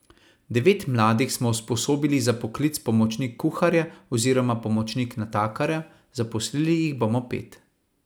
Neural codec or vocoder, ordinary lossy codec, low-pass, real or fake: none; none; none; real